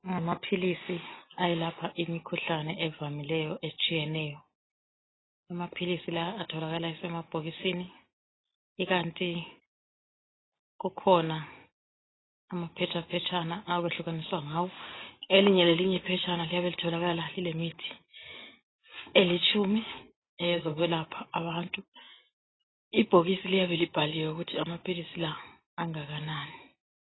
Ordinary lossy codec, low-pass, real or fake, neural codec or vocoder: AAC, 16 kbps; 7.2 kHz; real; none